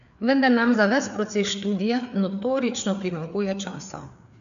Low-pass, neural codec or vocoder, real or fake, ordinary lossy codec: 7.2 kHz; codec, 16 kHz, 4 kbps, FreqCodec, larger model; fake; none